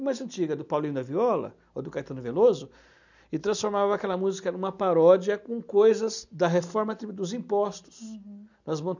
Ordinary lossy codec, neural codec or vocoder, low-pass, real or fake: none; none; 7.2 kHz; real